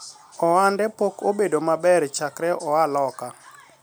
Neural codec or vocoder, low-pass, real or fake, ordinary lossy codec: none; none; real; none